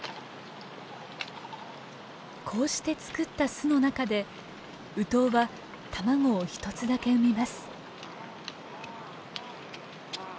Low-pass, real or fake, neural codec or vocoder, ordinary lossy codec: none; real; none; none